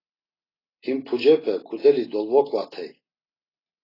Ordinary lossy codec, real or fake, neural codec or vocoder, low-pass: AAC, 24 kbps; real; none; 5.4 kHz